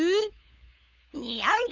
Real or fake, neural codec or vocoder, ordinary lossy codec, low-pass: fake; codec, 16 kHz, 8 kbps, FunCodec, trained on LibriTTS, 25 frames a second; none; 7.2 kHz